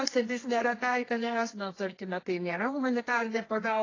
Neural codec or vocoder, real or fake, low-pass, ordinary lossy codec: codec, 24 kHz, 0.9 kbps, WavTokenizer, medium music audio release; fake; 7.2 kHz; AAC, 32 kbps